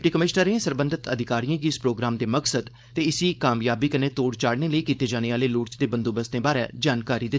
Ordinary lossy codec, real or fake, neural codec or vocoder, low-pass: none; fake; codec, 16 kHz, 4.8 kbps, FACodec; none